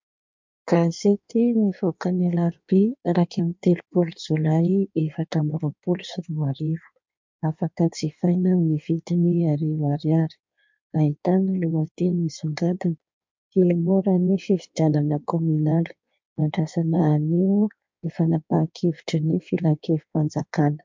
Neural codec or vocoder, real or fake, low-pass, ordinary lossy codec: codec, 16 kHz in and 24 kHz out, 1.1 kbps, FireRedTTS-2 codec; fake; 7.2 kHz; MP3, 64 kbps